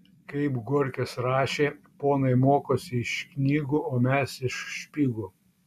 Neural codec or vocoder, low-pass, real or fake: none; 14.4 kHz; real